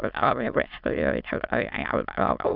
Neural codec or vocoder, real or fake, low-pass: autoencoder, 22.05 kHz, a latent of 192 numbers a frame, VITS, trained on many speakers; fake; 5.4 kHz